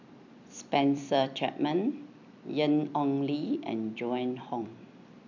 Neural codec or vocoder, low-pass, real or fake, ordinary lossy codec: vocoder, 44.1 kHz, 128 mel bands every 256 samples, BigVGAN v2; 7.2 kHz; fake; none